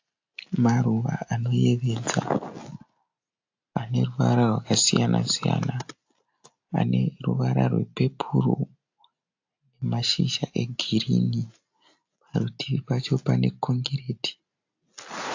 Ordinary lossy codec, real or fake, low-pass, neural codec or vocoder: AAC, 48 kbps; real; 7.2 kHz; none